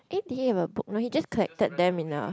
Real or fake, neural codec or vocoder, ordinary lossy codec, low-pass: real; none; none; none